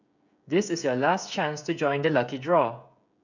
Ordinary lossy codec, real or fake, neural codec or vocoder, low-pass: none; fake; codec, 16 kHz, 16 kbps, FreqCodec, smaller model; 7.2 kHz